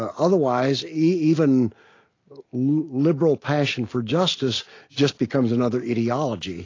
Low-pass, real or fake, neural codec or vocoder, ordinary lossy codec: 7.2 kHz; real; none; AAC, 32 kbps